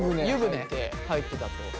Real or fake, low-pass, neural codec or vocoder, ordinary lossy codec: real; none; none; none